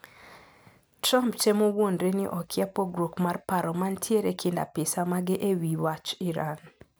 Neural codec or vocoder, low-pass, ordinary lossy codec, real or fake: none; none; none; real